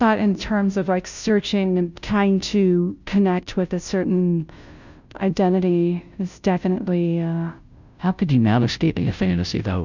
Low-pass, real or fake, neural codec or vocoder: 7.2 kHz; fake; codec, 16 kHz, 0.5 kbps, FunCodec, trained on Chinese and English, 25 frames a second